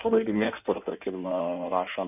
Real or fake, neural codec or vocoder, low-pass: fake; codec, 16 kHz in and 24 kHz out, 1.1 kbps, FireRedTTS-2 codec; 3.6 kHz